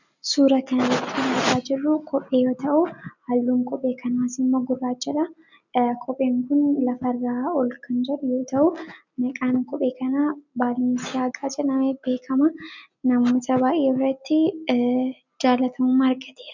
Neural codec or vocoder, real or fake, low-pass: none; real; 7.2 kHz